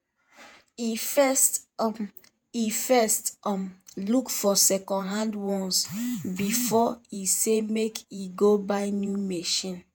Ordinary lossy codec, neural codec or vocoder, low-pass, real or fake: none; vocoder, 48 kHz, 128 mel bands, Vocos; none; fake